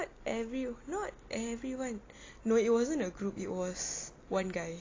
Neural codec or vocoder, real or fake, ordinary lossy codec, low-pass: none; real; AAC, 32 kbps; 7.2 kHz